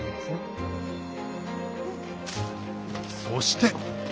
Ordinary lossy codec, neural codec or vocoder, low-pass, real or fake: none; none; none; real